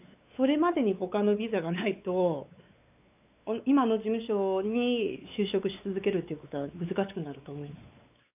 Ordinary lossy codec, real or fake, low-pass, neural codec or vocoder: none; fake; 3.6 kHz; codec, 16 kHz, 4 kbps, X-Codec, WavLM features, trained on Multilingual LibriSpeech